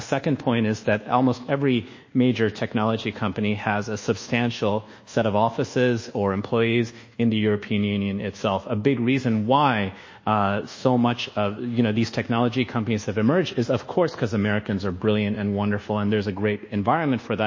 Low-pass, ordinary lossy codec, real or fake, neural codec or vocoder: 7.2 kHz; MP3, 32 kbps; fake; codec, 24 kHz, 1.2 kbps, DualCodec